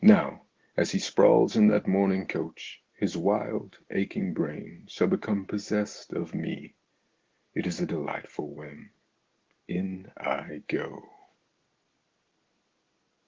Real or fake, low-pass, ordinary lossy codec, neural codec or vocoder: real; 7.2 kHz; Opus, 16 kbps; none